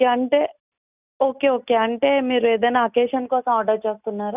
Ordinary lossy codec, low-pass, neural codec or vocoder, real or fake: none; 3.6 kHz; none; real